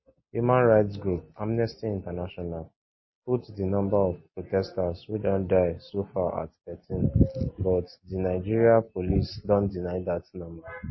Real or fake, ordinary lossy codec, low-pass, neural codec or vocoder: real; MP3, 24 kbps; 7.2 kHz; none